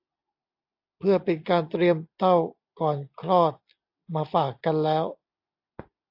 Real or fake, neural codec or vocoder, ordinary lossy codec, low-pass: real; none; AAC, 48 kbps; 5.4 kHz